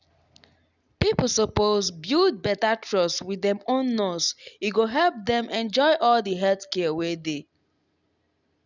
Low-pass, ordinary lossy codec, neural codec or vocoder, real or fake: 7.2 kHz; none; none; real